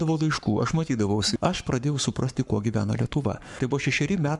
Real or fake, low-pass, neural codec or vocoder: fake; 10.8 kHz; codec, 44.1 kHz, 7.8 kbps, Pupu-Codec